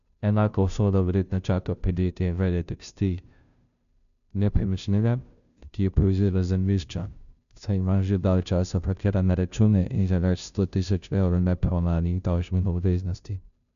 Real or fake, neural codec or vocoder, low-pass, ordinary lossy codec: fake; codec, 16 kHz, 0.5 kbps, FunCodec, trained on Chinese and English, 25 frames a second; 7.2 kHz; none